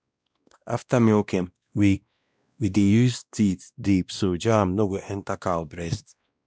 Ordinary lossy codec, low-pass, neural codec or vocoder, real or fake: none; none; codec, 16 kHz, 1 kbps, X-Codec, WavLM features, trained on Multilingual LibriSpeech; fake